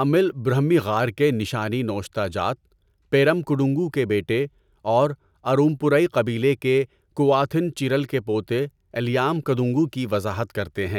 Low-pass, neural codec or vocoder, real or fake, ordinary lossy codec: 19.8 kHz; none; real; none